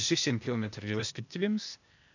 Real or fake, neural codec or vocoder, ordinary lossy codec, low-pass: fake; codec, 16 kHz, 0.8 kbps, ZipCodec; none; 7.2 kHz